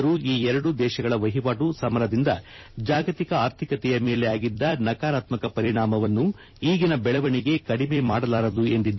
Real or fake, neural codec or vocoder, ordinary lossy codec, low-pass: fake; vocoder, 44.1 kHz, 128 mel bands every 256 samples, BigVGAN v2; MP3, 24 kbps; 7.2 kHz